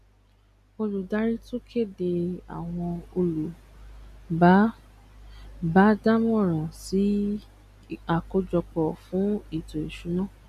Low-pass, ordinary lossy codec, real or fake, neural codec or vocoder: 14.4 kHz; none; real; none